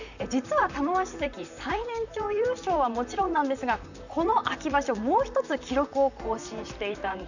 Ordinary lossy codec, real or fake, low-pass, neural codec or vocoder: none; fake; 7.2 kHz; vocoder, 44.1 kHz, 128 mel bands, Pupu-Vocoder